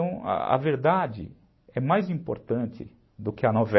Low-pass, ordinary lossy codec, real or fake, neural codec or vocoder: 7.2 kHz; MP3, 24 kbps; fake; vocoder, 44.1 kHz, 128 mel bands every 256 samples, BigVGAN v2